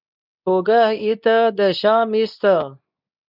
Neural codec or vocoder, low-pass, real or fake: codec, 24 kHz, 0.9 kbps, WavTokenizer, medium speech release version 2; 5.4 kHz; fake